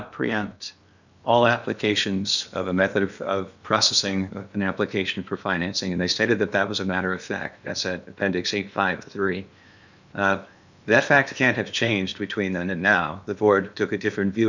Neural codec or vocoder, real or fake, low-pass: codec, 16 kHz in and 24 kHz out, 0.8 kbps, FocalCodec, streaming, 65536 codes; fake; 7.2 kHz